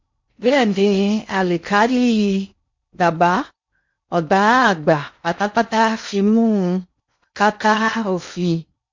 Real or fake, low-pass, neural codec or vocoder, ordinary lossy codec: fake; 7.2 kHz; codec, 16 kHz in and 24 kHz out, 0.6 kbps, FocalCodec, streaming, 2048 codes; MP3, 48 kbps